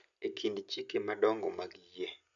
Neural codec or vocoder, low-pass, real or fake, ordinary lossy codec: none; 7.2 kHz; real; none